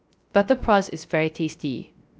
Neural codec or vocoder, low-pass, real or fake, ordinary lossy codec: codec, 16 kHz, 0.3 kbps, FocalCodec; none; fake; none